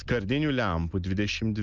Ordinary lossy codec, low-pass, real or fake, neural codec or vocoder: Opus, 24 kbps; 7.2 kHz; real; none